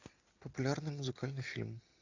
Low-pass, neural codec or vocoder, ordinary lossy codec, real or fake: 7.2 kHz; none; AAC, 48 kbps; real